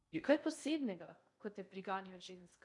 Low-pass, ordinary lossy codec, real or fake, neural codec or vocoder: 10.8 kHz; none; fake; codec, 16 kHz in and 24 kHz out, 0.6 kbps, FocalCodec, streaming, 2048 codes